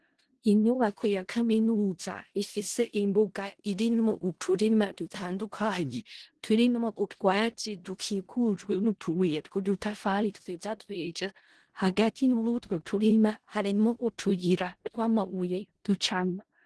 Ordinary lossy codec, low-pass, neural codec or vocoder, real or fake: Opus, 16 kbps; 10.8 kHz; codec, 16 kHz in and 24 kHz out, 0.4 kbps, LongCat-Audio-Codec, four codebook decoder; fake